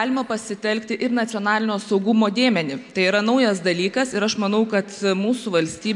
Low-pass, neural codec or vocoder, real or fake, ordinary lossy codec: 10.8 kHz; none; real; MP3, 64 kbps